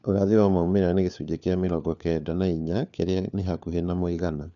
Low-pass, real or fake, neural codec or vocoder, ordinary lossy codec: 7.2 kHz; fake; codec, 16 kHz, 4 kbps, FunCodec, trained on LibriTTS, 50 frames a second; none